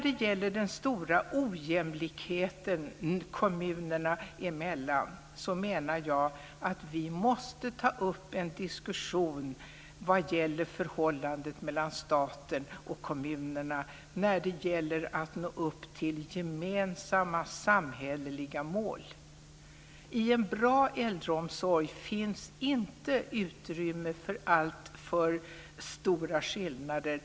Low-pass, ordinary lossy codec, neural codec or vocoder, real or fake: none; none; none; real